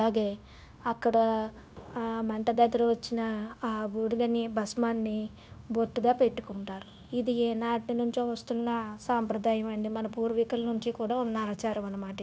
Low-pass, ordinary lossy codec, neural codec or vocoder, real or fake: none; none; codec, 16 kHz, 0.9 kbps, LongCat-Audio-Codec; fake